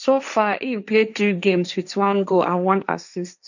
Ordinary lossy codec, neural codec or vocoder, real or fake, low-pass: none; codec, 16 kHz, 1.1 kbps, Voila-Tokenizer; fake; 7.2 kHz